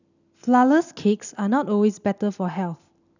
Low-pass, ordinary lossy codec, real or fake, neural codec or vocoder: 7.2 kHz; none; real; none